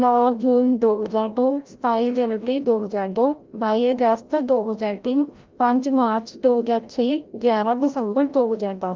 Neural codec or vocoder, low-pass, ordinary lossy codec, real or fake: codec, 16 kHz, 0.5 kbps, FreqCodec, larger model; 7.2 kHz; Opus, 32 kbps; fake